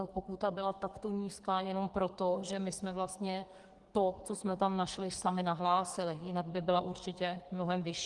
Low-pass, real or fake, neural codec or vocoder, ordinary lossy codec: 10.8 kHz; fake; codec, 44.1 kHz, 2.6 kbps, SNAC; Opus, 24 kbps